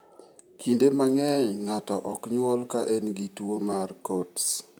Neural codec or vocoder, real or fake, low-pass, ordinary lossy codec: vocoder, 44.1 kHz, 128 mel bands, Pupu-Vocoder; fake; none; none